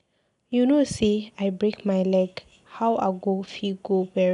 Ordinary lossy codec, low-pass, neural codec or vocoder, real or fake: none; 10.8 kHz; none; real